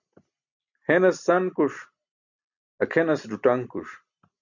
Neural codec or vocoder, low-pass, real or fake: none; 7.2 kHz; real